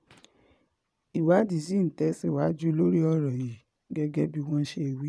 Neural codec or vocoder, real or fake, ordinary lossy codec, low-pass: vocoder, 22.05 kHz, 80 mel bands, Vocos; fake; none; none